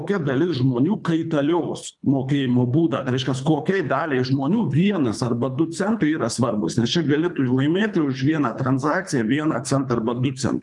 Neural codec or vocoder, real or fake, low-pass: codec, 24 kHz, 3 kbps, HILCodec; fake; 10.8 kHz